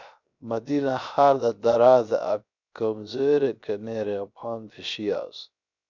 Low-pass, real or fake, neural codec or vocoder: 7.2 kHz; fake; codec, 16 kHz, 0.3 kbps, FocalCodec